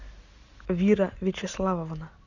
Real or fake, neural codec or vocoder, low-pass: real; none; 7.2 kHz